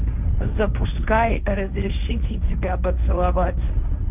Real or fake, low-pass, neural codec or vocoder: fake; 3.6 kHz; codec, 16 kHz, 1.1 kbps, Voila-Tokenizer